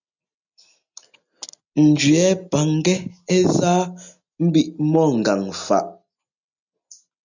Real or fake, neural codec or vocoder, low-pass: real; none; 7.2 kHz